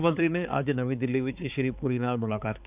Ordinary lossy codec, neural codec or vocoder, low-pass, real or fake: none; codec, 16 kHz, 4 kbps, X-Codec, HuBERT features, trained on balanced general audio; 3.6 kHz; fake